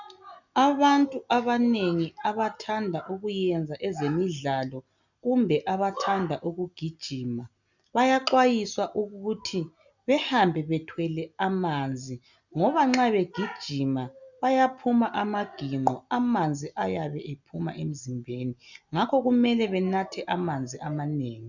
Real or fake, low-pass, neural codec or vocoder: real; 7.2 kHz; none